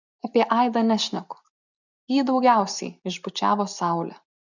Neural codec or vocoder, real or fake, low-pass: none; real; 7.2 kHz